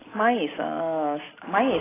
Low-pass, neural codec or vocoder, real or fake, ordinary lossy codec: 3.6 kHz; none; real; AAC, 16 kbps